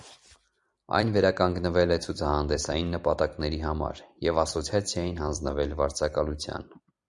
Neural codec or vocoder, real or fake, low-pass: vocoder, 44.1 kHz, 128 mel bands every 256 samples, BigVGAN v2; fake; 10.8 kHz